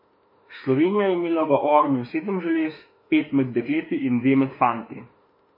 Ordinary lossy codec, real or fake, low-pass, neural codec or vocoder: MP3, 24 kbps; fake; 5.4 kHz; autoencoder, 48 kHz, 32 numbers a frame, DAC-VAE, trained on Japanese speech